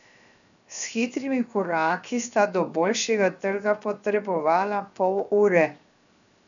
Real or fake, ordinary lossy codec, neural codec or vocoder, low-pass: fake; MP3, 96 kbps; codec, 16 kHz, 0.7 kbps, FocalCodec; 7.2 kHz